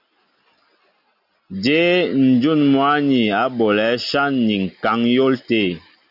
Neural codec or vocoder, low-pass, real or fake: none; 5.4 kHz; real